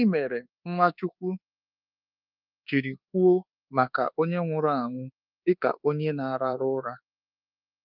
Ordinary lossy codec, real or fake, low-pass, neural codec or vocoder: Opus, 24 kbps; fake; 5.4 kHz; codec, 24 kHz, 1.2 kbps, DualCodec